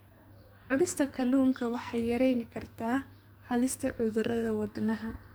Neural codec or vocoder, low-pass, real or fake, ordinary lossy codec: codec, 44.1 kHz, 2.6 kbps, SNAC; none; fake; none